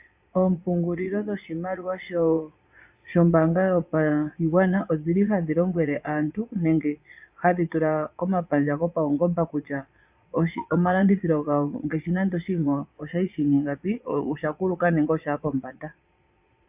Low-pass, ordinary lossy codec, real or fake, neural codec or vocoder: 3.6 kHz; AAC, 32 kbps; fake; vocoder, 22.05 kHz, 80 mel bands, Vocos